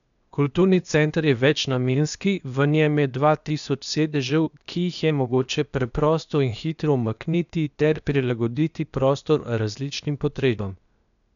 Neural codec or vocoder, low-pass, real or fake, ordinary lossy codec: codec, 16 kHz, 0.8 kbps, ZipCodec; 7.2 kHz; fake; none